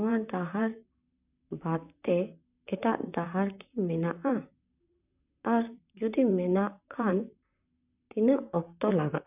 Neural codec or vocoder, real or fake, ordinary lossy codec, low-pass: vocoder, 44.1 kHz, 128 mel bands, Pupu-Vocoder; fake; none; 3.6 kHz